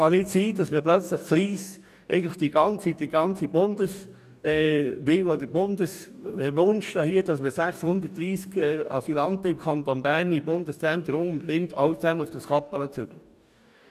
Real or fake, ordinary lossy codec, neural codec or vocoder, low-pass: fake; none; codec, 44.1 kHz, 2.6 kbps, DAC; 14.4 kHz